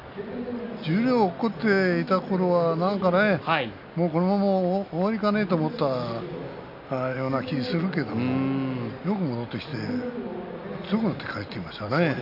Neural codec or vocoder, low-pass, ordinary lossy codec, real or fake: none; 5.4 kHz; none; real